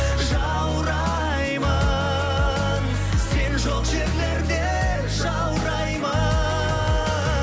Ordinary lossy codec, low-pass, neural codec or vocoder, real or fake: none; none; none; real